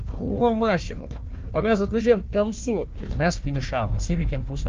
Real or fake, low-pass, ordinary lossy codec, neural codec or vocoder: fake; 7.2 kHz; Opus, 32 kbps; codec, 16 kHz, 1 kbps, FunCodec, trained on Chinese and English, 50 frames a second